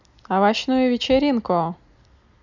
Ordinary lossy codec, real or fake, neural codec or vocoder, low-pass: none; real; none; 7.2 kHz